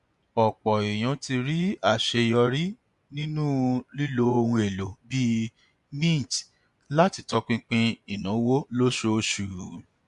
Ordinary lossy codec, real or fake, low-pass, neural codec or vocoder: MP3, 64 kbps; fake; 10.8 kHz; vocoder, 24 kHz, 100 mel bands, Vocos